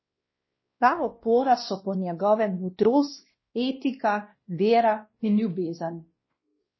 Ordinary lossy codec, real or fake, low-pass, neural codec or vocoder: MP3, 24 kbps; fake; 7.2 kHz; codec, 16 kHz, 1 kbps, X-Codec, WavLM features, trained on Multilingual LibriSpeech